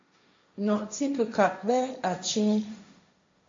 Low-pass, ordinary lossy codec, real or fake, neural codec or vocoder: 7.2 kHz; MP3, 48 kbps; fake; codec, 16 kHz, 1.1 kbps, Voila-Tokenizer